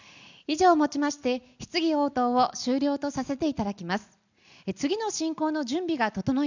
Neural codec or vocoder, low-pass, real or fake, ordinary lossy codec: none; 7.2 kHz; real; none